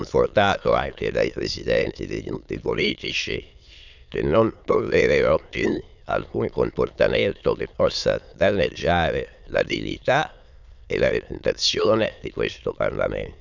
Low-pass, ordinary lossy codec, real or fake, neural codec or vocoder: 7.2 kHz; none; fake; autoencoder, 22.05 kHz, a latent of 192 numbers a frame, VITS, trained on many speakers